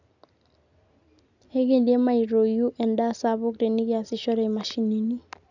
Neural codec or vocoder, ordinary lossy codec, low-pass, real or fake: none; none; 7.2 kHz; real